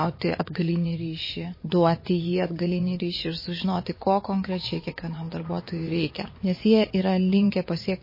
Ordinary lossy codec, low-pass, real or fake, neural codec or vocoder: MP3, 24 kbps; 5.4 kHz; fake; vocoder, 24 kHz, 100 mel bands, Vocos